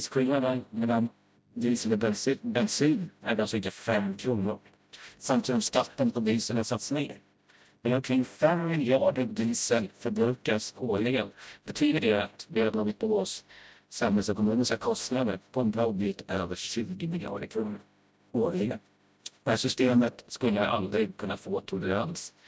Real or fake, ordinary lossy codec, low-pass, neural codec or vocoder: fake; none; none; codec, 16 kHz, 0.5 kbps, FreqCodec, smaller model